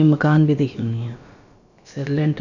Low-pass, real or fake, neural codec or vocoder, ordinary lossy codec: 7.2 kHz; fake; codec, 16 kHz, about 1 kbps, DyCAST, with the encoder's durations; none